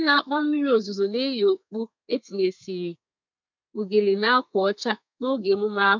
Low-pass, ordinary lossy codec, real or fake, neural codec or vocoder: 7.2 kHz; MP3, 64 kbps; fake; codec, 32 kHz, 1.9 kbps, SNAC